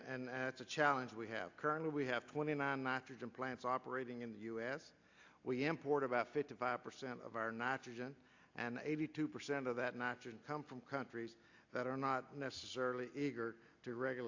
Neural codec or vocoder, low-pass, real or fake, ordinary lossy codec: none; 7.2 kHz; real; AAC, 48 kbps